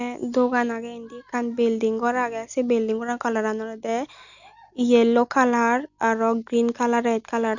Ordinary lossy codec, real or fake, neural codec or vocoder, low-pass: none; real; none; 7.2 kHz